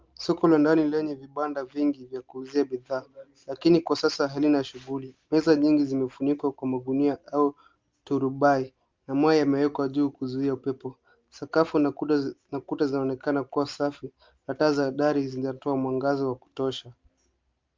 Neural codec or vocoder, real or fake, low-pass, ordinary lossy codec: none; real; 7.2 kHz; Opus, 24 kbps